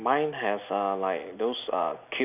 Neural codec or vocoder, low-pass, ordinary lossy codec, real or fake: none; 3.6 kHz; none; real